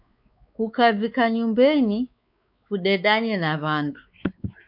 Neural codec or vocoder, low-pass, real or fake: codec, 16 kHz, 4 kbps, X-Codec, WavLM features, trained on Multilingual LibriSpeech; 5.4 kHz; fake